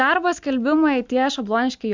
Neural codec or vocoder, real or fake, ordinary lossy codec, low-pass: none; real; MP3, 64 kbps; 7.2 kHz